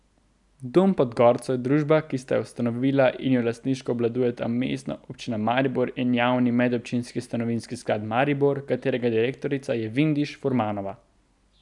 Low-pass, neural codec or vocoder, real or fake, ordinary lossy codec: 10.8 kHz; none; real; none